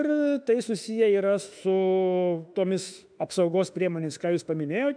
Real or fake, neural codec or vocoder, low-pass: fake; autoencoder, 48 kHz, 32 numbers a frame, DAC-VAE, trained on Japanese speech; 9.9 kHz